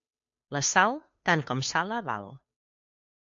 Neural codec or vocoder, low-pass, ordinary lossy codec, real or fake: codec, 16 kHz, 2 kbps, FunCodec, trained on Chinese and English, 25 frames a second; 7.2 kHz; MP3, 48 kbps; fake